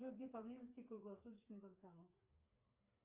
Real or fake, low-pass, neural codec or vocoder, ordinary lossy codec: fake; 3.6 kHz; codec, 16 kHz, 4 kbps, FreqCodec, smaller model; Opus, 32 kbps